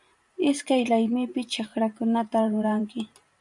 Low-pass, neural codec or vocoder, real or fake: 10.8 kHz; vocoder, 44.1 kHz, 128 mel bands every 512 samples, BigVGAN v2; fake